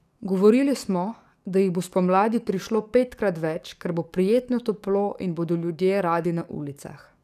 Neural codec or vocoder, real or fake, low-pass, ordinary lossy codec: codec, 44.1 kHz, 7.8 kbps, DAC; fake; 14.4 kHz; none